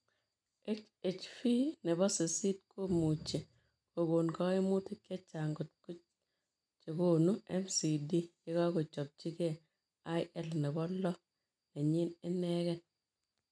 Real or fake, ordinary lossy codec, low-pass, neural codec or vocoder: real; none; 9.9 kHz; none